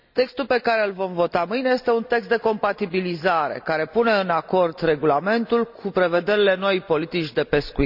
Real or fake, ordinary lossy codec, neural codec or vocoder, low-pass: real; none; none; 5.4 kHz